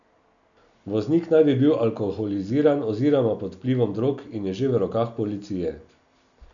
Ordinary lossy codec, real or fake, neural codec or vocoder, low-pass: none; real; none; 7.2 kHz